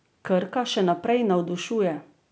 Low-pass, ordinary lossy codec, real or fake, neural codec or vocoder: none; none; real; none